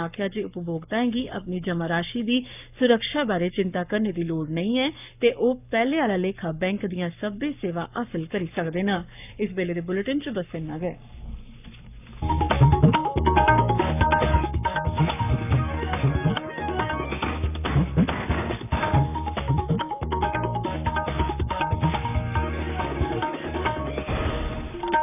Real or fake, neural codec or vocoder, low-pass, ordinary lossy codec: fake; codec, 44.1 kHz, 7.8 kbps, Pupu-Codec; 3.6 kHz; none